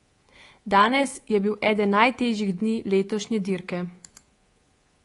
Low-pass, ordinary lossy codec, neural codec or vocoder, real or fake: 10.8 kHz; AAC, 32 kbps; codec, 24 kHz, 3.1 kbps, DualCodec; fake